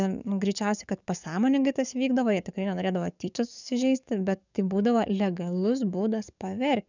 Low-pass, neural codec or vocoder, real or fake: 7.2 kHz; codec, 44.1 kHz, 7.8 kbps, DAC; fake